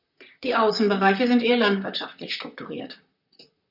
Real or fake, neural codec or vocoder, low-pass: fake; vocoder, 44.1 kHz, 128 mel bands, Pupu-Vocoder; 5.4 kHz